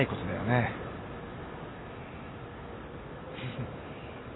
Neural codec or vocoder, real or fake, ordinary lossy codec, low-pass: none; real; AAC, 16 kbps; 7.2 kHz